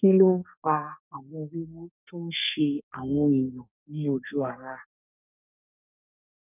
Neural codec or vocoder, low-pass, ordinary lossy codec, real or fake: codec, 32 kHz, 1.9 kbps, SNAC; 3.6 kHz; none; fake